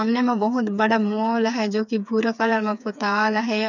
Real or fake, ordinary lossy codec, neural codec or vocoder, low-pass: fake; none; codec, 16 kHz, 4 kbps, FreqCodec, smaller model; 7.2 kHz